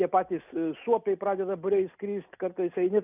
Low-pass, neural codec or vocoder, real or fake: 3.6 kHz; none; real